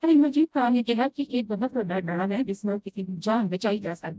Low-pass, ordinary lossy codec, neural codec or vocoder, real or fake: none; none; codec, 16 kHz, 0.5 kbps, FreqCodec, smaller model; fake